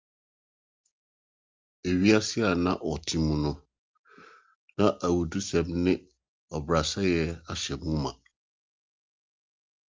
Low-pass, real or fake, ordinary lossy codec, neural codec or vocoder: 7.2 kHz; real; Opus, 24 kbps; none